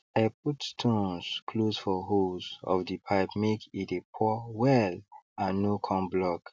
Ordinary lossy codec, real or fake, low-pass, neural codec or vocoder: none; real; none; none